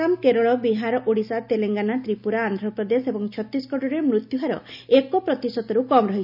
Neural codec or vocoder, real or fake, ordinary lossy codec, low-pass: none; real; none; 5.4 kHz